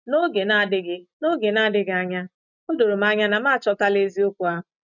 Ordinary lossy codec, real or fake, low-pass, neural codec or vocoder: none; real; 7.2 kHz; none